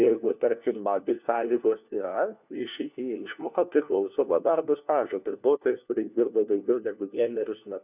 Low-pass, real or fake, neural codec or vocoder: 3.6 kHz; fake; codec, 16 kHz, 1 kbps, FunCodec, trained on LibriTTS, 50 frames a second